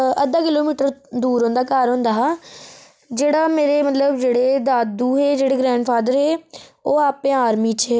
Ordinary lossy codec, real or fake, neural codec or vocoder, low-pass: none; real; none; none